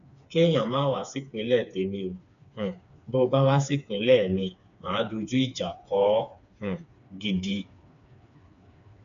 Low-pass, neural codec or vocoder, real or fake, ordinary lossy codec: 7.2 kHz; codec, 16 kHz, 4 kbps, FreqCodec, smaller model; fake; none